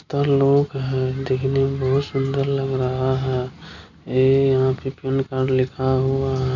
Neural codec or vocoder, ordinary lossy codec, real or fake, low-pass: none; none; real; 7.2 kHz